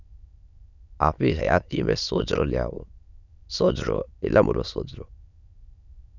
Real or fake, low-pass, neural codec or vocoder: fake; 7.2 kHz; autoencoder, 22.05 kHz, a latent of 192 numbers a frame, VITS, trained on many speakers